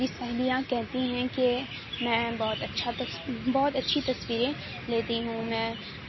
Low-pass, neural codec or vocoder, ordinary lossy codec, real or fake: 7.2 kHz; none; MP3, 24 kbps; real